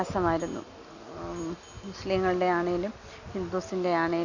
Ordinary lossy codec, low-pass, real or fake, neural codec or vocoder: Opus, 64 kbps; 7.2 kHz; real; none